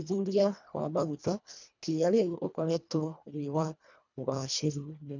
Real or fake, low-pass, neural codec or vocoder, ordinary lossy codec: fake; 7.2 kHz; codec, 24 kHz, 1.5 kbps, HILCodec; none